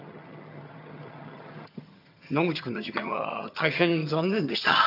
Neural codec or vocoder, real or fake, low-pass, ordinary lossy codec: vocoder, 22.05 kHz, 80 mel bands, HiFi-GAN; fake; 5.4 kHz; none